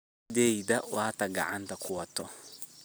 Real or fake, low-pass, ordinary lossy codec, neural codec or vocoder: real; none; none; none